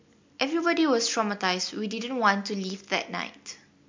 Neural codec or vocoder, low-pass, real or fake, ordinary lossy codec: none; 7.2 kHz; real; MP3, 48 kbps